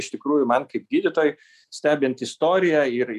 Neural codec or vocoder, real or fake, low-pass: none; real; 14.4 kHz